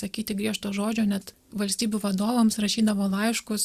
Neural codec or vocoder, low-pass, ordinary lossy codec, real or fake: none; 14.4 kHz; Opus, 64 kbps; real